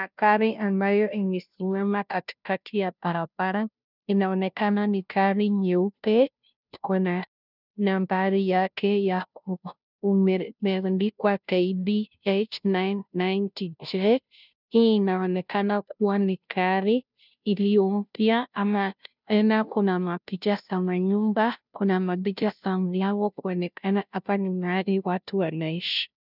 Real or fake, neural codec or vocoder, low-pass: fake; codec, 16 kHz, 0.5 kbps, FunCodec, trained on Chinese and English, 25 frames a second; 5.4 kHz